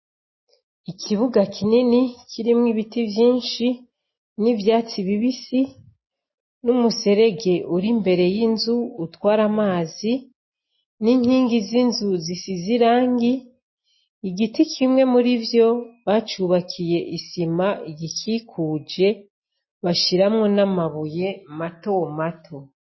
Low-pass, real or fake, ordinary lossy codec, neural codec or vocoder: 7.2 kHz; real; MP3, 24 kbps; none